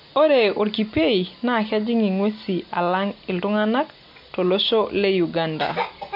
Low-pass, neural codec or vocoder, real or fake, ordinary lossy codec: 5.4 kHz; none; real; MP3, 48 kbps